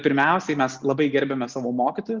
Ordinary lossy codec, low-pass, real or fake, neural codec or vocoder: Opus, 24 kbps; 7.2 kHz; real; none